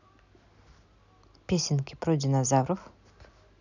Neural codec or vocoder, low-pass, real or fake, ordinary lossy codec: none; 7.2 kHz; real; none